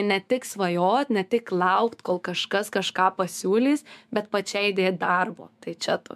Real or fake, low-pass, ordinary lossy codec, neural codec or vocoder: fake; 14.4 kHz; MP3, 96 kbps; autoencoder, 48 kHz, 128 numbers a frame, DAC-VAE, trained on Japanese speech